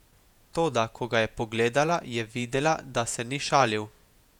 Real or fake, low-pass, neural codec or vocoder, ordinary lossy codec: fake; 19.8 kHz; vocoder, 48 kHz, 128 mel bands, Vocos; none